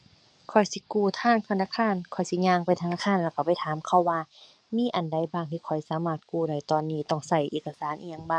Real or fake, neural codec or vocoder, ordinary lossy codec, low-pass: fake; codec, 44.1 kHz, 7.8 kbps, DAC; none; 9.9 kHz